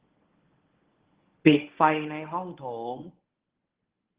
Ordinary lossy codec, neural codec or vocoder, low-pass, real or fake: Opus, 16 kbps; codec, 24 kHz, 0.9 kbps, WavTokenizer, medium speech release version 1; 3.6 kHz; fake